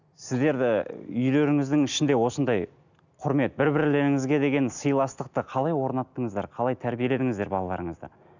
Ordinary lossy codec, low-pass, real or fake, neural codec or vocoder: none; 7.2 kHz; real; none